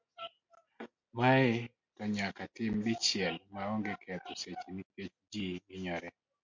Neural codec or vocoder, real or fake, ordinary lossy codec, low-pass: none; real; MP3, 64 kbps; 7.2 kHz